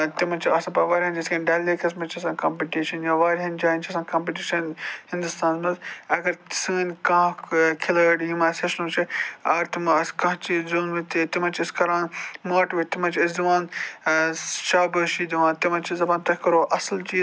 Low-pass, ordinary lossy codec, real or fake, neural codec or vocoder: none; none; real; none